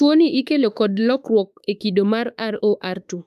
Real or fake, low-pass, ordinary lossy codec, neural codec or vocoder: fake; 14.4 kHz; none; autoencoder, 48 kHz, 32 numbers a frame, DAC-VAE, trained on Japanese speech